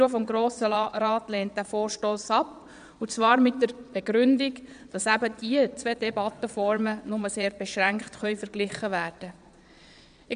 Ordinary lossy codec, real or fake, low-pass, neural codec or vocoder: none; fake; 9.9 kHz; vocoder, 22.05 kHz, 80 mel bands, Vocos